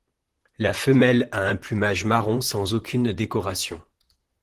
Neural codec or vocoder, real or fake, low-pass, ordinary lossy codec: vocoder, 44.1 kHz, 128 mel bands, Pupu-Vocoder; fake; 14.4 kHz; Opus, 16 kbps